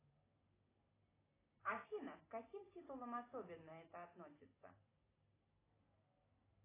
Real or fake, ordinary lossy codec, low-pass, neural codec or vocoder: real; AAC, 16 kbps; 3.6 kHz; none